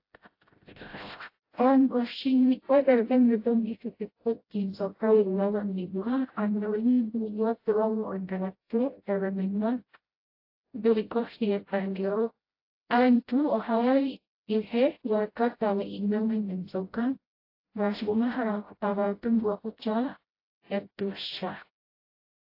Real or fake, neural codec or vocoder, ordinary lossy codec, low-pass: fake; codec, 16 kHz, 0.5 kbps, FreqCodec, smaller model; AAC, 32 kbps; 5.4 kHz